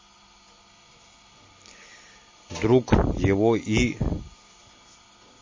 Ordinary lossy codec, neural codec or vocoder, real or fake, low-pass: MP3, 32 kbps; none; real; 7.2 kHz